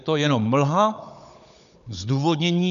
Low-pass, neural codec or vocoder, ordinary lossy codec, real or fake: 7.2 kHz; codec, 16 kHz, 16 kbps, FunCodec, trained on Chinese and English, 50 frames a second; MP3, 96 kbps; fake